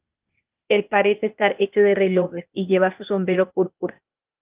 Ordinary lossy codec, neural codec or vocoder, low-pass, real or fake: Opus, 32 kbps; codec, 16 kHz, 0.8 kbps, ZipCodec; 3.6 kHz; fake